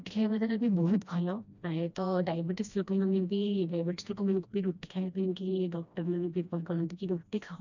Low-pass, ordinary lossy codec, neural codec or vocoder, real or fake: 7.2 kHz; none; codec, 16 kHz, 1 kbps, FreqCodec, smaller model; fake